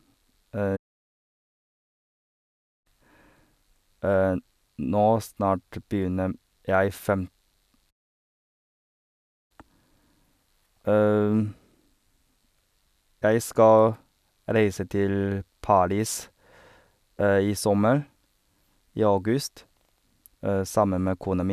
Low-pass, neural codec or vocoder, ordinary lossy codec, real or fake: 14.4 kHz; none; none; real